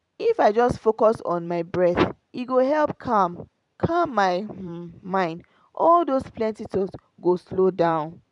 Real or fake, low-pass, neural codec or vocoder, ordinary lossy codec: fake; 10.8 kHz; vocoder, 44.1 kHz, 128 mel bands every 512 samples, BigVGAN v2; none